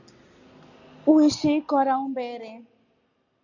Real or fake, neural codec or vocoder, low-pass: real; none; 7.2 kHz